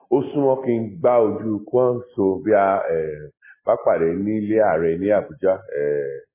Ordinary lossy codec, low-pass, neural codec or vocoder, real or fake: MP3, 16 kbps; 3.6 kHz; none; real